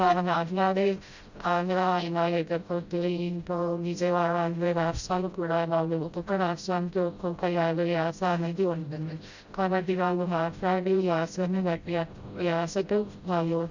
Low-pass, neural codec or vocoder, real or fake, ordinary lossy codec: 7.2 kHz; codec, 16 kHz, 0.5 kbps, FreqCodec, smaller model; fake; Opus, 64 kbps